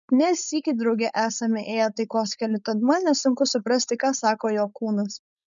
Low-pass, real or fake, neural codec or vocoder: 7.2 kHz; fake; codec, 16 kHz, 4.8 kbps, FACodec